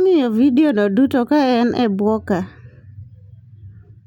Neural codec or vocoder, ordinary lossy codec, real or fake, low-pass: none; none; real; 19.8 kHz